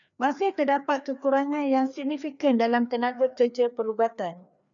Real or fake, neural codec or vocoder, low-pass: fake; codec, 16 kHz, 2 kbps, FreqCodec, larger model; 7.2 kHz